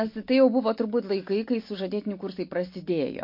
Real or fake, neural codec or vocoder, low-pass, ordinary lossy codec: real; none; 5.4 kHz; MP3, 32 kbps